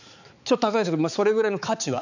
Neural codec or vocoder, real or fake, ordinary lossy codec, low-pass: codec, 16 kHz, 4 kbps, X-Codec, HuBERT features, trained on balanced general audio; fake; none; 7.2 kHz